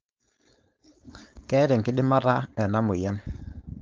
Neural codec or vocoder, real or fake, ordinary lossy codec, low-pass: codec, 16 kHz, 4.8 kbps, FACodec; fake; Opus, 24 kbps; 7.2 kHz